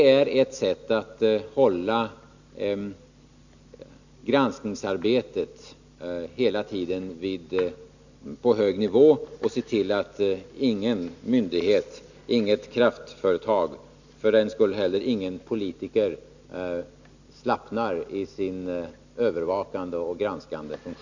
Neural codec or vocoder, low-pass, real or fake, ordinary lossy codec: none; 7.2 kHz; real; none